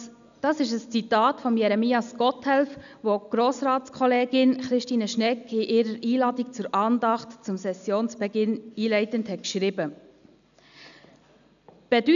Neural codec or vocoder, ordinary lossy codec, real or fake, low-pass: none; none; real; 7.2 kHz